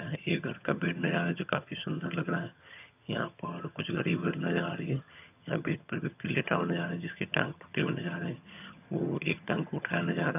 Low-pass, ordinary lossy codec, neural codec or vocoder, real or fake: 3.6 kHz; none; vocoder, 22.05 kHz, 80 mel bands, HiFi-GAN; fake